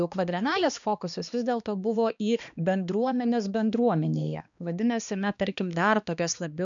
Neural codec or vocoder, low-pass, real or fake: codec, 16 kHz, 2 kbps, X-Codec, HuBERT features, trained on balanced general audio; 7.2 kHz; fake